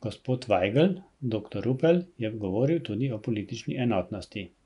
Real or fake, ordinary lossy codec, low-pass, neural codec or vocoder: real; none; 10.8 kHz; none